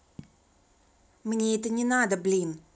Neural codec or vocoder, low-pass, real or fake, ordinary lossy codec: none; none; real; none